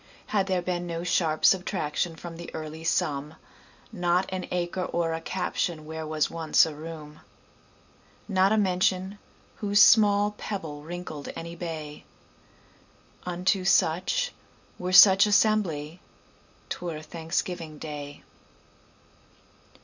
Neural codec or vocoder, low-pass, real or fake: none; 7.2 kHz; real